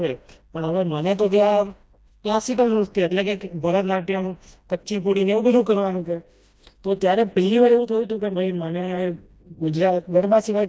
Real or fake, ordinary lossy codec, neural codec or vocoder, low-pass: fake; none; codec, 16 kHz, 1 kbps, FreqCodec, smaller model; none